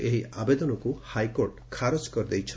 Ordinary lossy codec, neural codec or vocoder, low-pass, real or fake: none; none; none; real